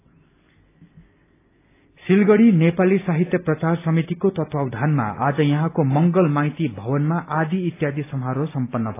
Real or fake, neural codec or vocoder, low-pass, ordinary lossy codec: real; none; 3.6 kHz; AAC, 24 kbps